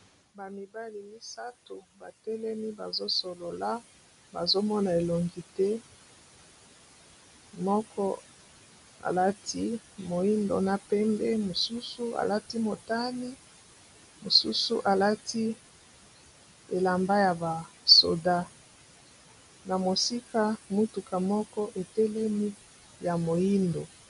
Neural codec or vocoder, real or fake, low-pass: none; real; 10.8 kHz